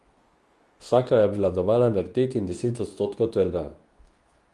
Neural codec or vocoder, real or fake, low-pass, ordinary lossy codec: codec, 24 kHz, 0.9 kbps, WavTokenizer, medium speech release version 2; fake; 10.8 kHz; Opus, 32 kbps